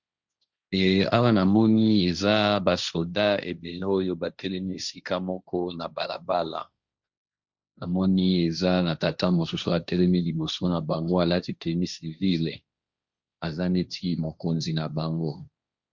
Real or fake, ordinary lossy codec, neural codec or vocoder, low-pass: fake; Opus, 64 kbps; codec, 16 kHz, 1.1 kbps, Voila-Tokenizer; 7.2 kHz